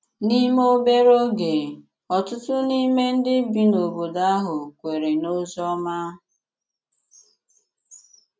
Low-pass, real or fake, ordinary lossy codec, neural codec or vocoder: none; real; none; none